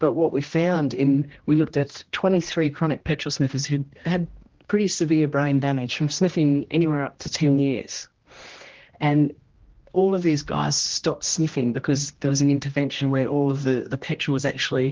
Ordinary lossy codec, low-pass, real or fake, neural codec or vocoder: Opus, 16 kbps; 7.2 kHz; fake; codec, 16 kHz, 1 kbps, X-Codec, HuBERT features, trained on general audio